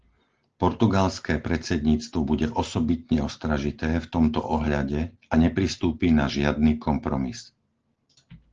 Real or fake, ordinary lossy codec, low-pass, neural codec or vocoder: real; Opus, 16 kbps; 7.2 kHz; none